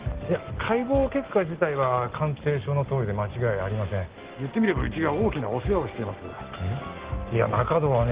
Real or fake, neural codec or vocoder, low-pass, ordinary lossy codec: real; none; 3.6 kHz; Opus, 16 kbps